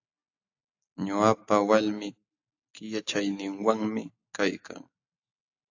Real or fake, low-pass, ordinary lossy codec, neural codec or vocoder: real; 7.2 kHz; AAC, 48 kbps; none